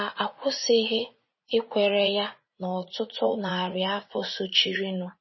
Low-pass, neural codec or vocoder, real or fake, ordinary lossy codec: 7.2 kHz; vocoder, 44.1 kHz, 80 mel bands, Vocos; fake; MP3, 24 kbps